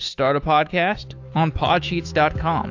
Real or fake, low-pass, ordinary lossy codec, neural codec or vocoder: fake; 7.2 kHz; AAC, 48 kbps; autoencoder, 48 kHz, 128 numbers a frame, DAC-VAE, trained on Japanese speech